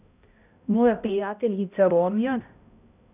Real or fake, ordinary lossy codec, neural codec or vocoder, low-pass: fake; none; codec, 16 kHz, 0.5 kbps, X-Codec, HuBERT features, trained on balanced general audio; 3.6 kHz